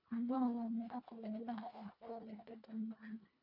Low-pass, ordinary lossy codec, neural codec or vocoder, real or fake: 5.4 kHz; MP3, 48 kbps; codec, 24 kHz, 1.5 kbps, HILCodec; fake